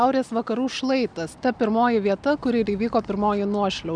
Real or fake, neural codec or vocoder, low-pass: real; none; 9.9 kHz